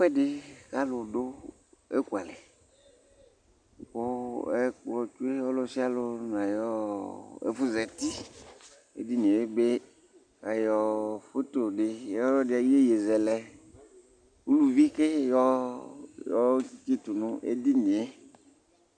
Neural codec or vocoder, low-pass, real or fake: none; 9.9 kHz; real